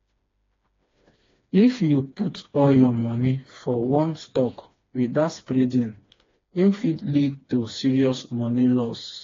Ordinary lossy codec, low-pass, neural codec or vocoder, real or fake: AAC, 32 kbps; 7.2 kHz; codec, 16 kHz, 2 kbps, FreqCodec, smaller model; fake